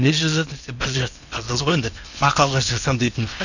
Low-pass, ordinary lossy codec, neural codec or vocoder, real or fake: 7.2 kHz; none; codec, 16 kHz in and 24 kHz out, 0.8 kbps, FocalCodec, streaming, 65536 codes; fake